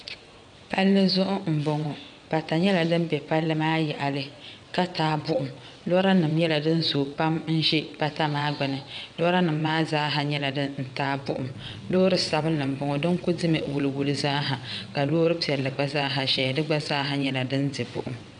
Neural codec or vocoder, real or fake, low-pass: vocoder, 22.05 kHz, 80 mel bands, WaveNeXt; fake; 9.9 kHz